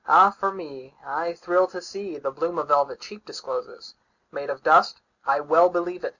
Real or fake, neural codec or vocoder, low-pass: real; none; 7.2 kHz